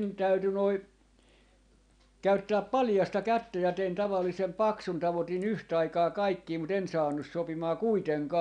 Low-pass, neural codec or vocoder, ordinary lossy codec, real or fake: 9.9 kHz; none; none; real